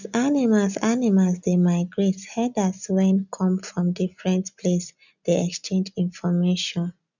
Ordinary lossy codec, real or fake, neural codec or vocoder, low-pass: none; real; none; 7.2 kHz